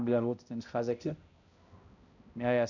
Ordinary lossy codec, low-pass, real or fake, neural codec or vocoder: none; 7.2 kHz; fake; codec, 16 kHz, 0.5 kbps, X-Codec, HuBERT features, trained on balanced general audio